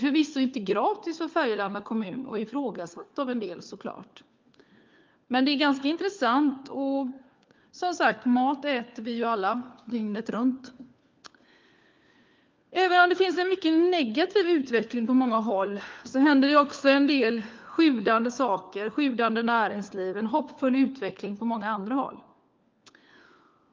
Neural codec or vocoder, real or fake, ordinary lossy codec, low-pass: codec, 16 kHz, 4 kbps, FunCodec, trained on LibriTTS, 50 frames a second; fake; Opus, 24 kbps; 7.2 kHz